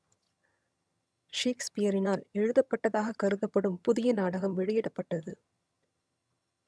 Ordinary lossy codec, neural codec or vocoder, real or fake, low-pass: none; vocoder, 22.05 kHz, 80 mel bands, HiFi-GAN; fake; none